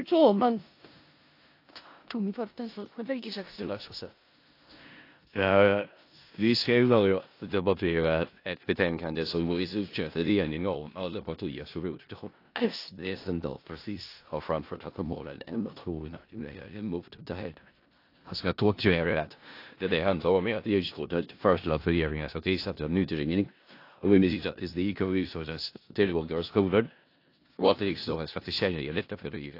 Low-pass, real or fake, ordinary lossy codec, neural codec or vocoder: 5.4 kHz; fake; AAC, 32 kbps; codec, 16 kHz in and 24 kHz out, 0.4 kbps, LongCat-Audio-Codec, four codebook decoder